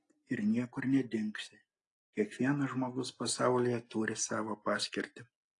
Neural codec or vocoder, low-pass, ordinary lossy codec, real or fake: none; 10.8 kHz; AAC, 48 kbps; real